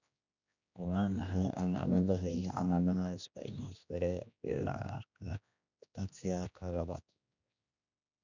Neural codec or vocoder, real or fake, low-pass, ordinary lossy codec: codec, 16 kHz, 1 kbps, X-Codec, HuBERT features, trained on general audio; fake; 7.2 kHz; none